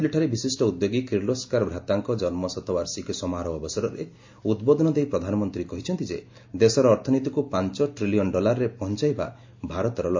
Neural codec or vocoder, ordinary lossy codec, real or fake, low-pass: none; MP3, 48 kbps; real; 7.2 kHz